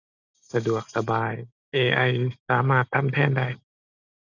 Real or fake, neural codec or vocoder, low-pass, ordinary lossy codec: real; none; 7.2 kHz; none